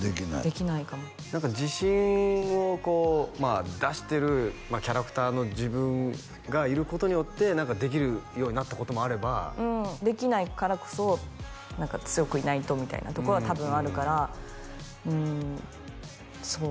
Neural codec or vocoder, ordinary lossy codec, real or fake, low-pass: none; none; real; none